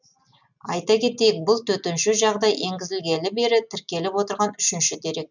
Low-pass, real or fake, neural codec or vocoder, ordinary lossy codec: 7.2 kHz; real; none; none